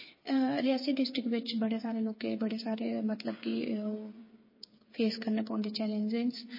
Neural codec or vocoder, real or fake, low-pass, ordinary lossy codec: codec, 16 kHz, 8 kbps, FreqCodec, smaller model; fake; 5.4 kHz; MP3, 24 kbps